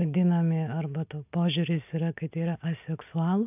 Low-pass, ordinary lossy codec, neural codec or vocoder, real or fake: 3.6 kHz; AAC, 32 kbps; none; real